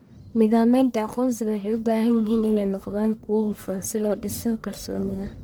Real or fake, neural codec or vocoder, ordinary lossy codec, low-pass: fake; codec, 44.1 kHz, 1.7 kbps, Pupu-Codec; none; none